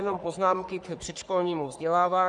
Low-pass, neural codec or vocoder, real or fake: 10.8 kHz; codec, 44.1 kHz, 3.4 kbps, Pupu-Codec; fake